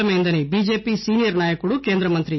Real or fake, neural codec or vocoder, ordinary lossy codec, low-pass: real; none; MP3, 24 kbps; 7.2 kHz